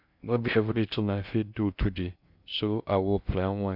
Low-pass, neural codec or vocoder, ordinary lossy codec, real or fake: 5.4 kHz; codec, 16 kHz in and 24 kHz out, 0.6 kbps, FocalCodec, streaming, 2048 codes; none; fake